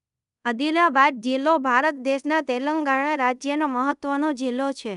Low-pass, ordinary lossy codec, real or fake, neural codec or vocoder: 10.8 kHz; none; fake; codec, 24 kHz, 0.5 kbps, DualCodec